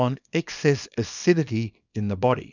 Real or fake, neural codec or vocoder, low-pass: fake; codec, 24 kHz, 0.9 kbps, WavTokenizer, small release; 7.2 kHz